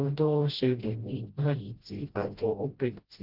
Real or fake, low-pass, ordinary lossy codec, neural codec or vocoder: fake; 5.4 kHz; Opus, 16 kbps; codec, 16 kHz, 0.5 kbps, FreqCodec, smaller model